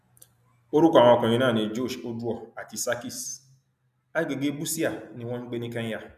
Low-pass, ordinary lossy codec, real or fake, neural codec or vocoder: 14.4 kHz; none; real; none